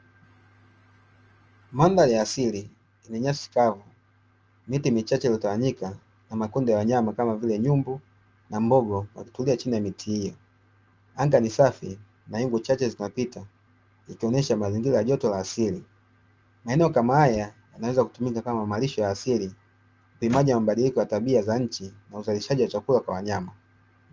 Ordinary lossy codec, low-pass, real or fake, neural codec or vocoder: Opus, 24 kbps; 7.2 kHz; real; none